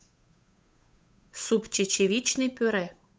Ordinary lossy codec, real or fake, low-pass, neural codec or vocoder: none; fake; none; codec, 16 kHz, 8 kbps, FunCodec, trained on Chinese and English, 25 frames a second